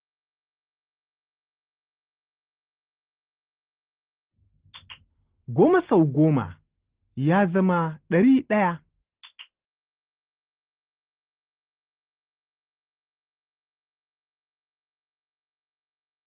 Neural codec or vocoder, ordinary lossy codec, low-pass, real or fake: none; Opus, 16 kbps; 3.6 kHz; real